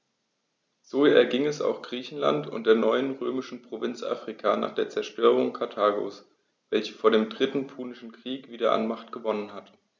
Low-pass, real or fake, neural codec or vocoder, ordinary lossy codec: none; real; none; none